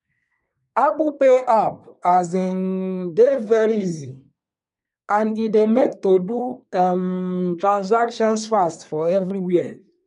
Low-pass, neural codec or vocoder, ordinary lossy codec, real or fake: 10.8 kHz; codec, 24 kHz, 1 kbps, SNAC; none; fake